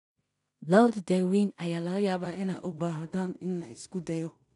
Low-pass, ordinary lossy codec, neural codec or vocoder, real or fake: 10.8 kHz; none; codec, 16 kHz in and 24 kHz out, 0.4 kbps, LongCat-Audio-Codec, two codebook decoder; fake